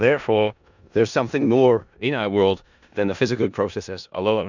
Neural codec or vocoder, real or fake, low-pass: codec, 16 kHz in and 24 kHz out, 0.4 kbps, LongCat-Audio-Codec, four codebook decoder; fake; 7.2 kHz